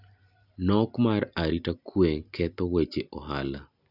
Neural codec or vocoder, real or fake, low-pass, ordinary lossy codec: none; real; 5.4 kHz; none